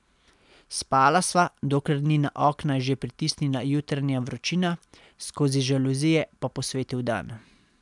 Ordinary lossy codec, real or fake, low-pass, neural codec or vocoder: MP3, 96 kbps; real; 10.8 kHz; none